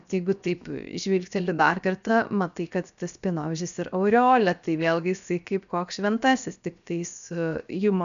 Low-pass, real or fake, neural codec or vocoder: 7.2 kHz; fake; codec, 16 kHz, 0.7 kbps, FocalCodec